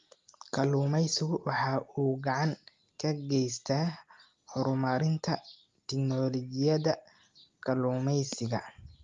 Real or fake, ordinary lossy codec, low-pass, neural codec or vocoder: real; Opus, 32 kbps; 7.2 kHz; none